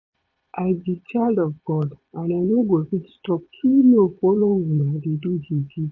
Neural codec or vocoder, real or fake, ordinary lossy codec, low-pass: vocoder, 22.05 kHz, 80 mel bands, Vocos; fake; none; 7.2 kHz